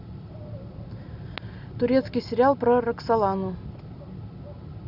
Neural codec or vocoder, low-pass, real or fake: none; 5.4 kHz; real